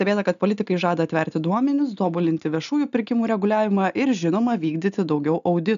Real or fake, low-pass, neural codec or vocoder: real; 7.2 kHz; none